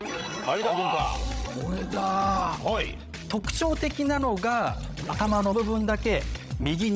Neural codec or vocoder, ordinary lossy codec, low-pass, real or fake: codec, 16 kHz, 16 kbps, FreqCodec, larger model; none; none; fake